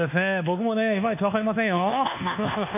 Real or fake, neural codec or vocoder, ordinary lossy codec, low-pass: fake; codec, 24 kHz, 1.2 kbps, DualCodec; none; 3.6 kHz